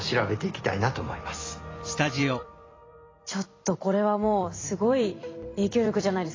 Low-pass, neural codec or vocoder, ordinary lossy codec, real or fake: 7.2 kHz; vocoder, 44.1 kHz, 128 mel bands every 256 samples, BigVGAN v2; AAC, 32 kbps; fake